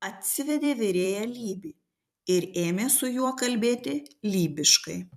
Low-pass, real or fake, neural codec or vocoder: 14.4 kHz; fake; vocoder, 44.1 kHz, 128 mel bands every 256 samples, BigVGAN v2